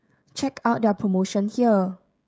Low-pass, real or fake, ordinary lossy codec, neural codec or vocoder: none; fake; none; codec, 16 kHz, 16 kbps, FreqCodec, smaller model